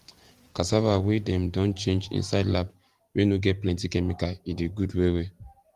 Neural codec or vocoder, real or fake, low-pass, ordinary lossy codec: vocoder, 44.1 kHz, 128 mel bands every 512 samples, BigVGAN v2; fake; 14.4 kHz; Opus, 24 kbps